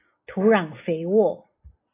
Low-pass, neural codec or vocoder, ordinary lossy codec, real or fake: 3.6 kHz; none; AAC, 24 kbps; real